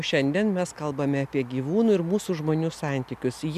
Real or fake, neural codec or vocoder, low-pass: real; none; 14.4 kHz